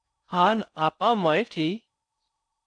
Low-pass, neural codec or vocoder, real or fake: 9.9 kHz; codec, 16 kHz in and 24 kHz out, 0.6 kbps, FocalCodec, streaming, 4096 codes; fake